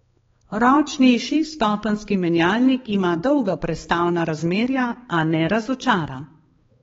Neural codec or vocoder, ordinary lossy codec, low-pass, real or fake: codec, 16 kHz, 4 kbps, X-Codec, HuBERT features, trained on balanced general audio; AAC, 24 kbps; 7.2 kHz; fake